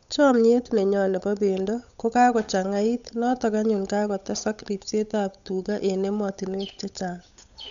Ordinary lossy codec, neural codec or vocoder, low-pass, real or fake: MP3, 96 kbps; codec, 16 kHz, 8 kbps, FunCodec, trained on Chinese and English, 25 frames a second; 7.2 kHz; fake